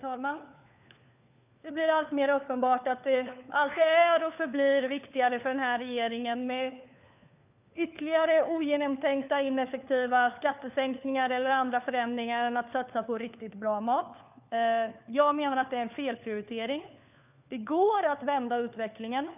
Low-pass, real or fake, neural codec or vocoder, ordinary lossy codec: 3.6 kHz; fake; codec, 16 kHz, 4 kbps, FunCodec, trained on LibriTTS, 50 frames a second; none